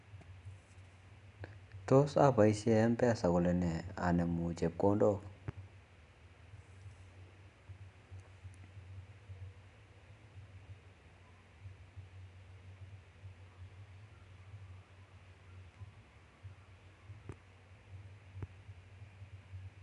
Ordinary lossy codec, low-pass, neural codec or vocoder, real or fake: none; 10.8 kHz; none; real